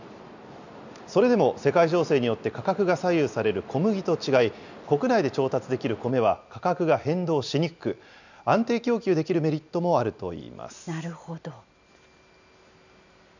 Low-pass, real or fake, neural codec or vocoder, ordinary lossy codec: 7.2 kHz; real; none; none